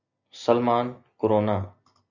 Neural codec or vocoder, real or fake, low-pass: none; real; 7.2 kHz